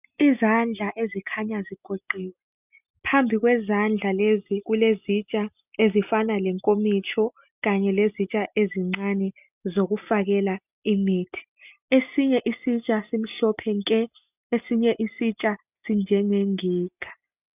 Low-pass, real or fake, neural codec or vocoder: 3.6 kHz; real; none